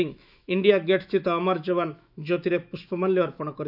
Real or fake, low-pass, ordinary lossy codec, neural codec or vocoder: fake; 5.4 kHz; none; autoencoder, 48 kHz, 128 numbers a frame, DAC-VAE, trained on Japanese speech